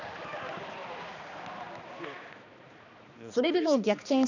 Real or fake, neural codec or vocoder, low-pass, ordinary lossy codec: fake; codec, 16 kHz, 2 kbps, X-Codec, HuBERT features, trained on balanced general audio; 7.2 kHz; none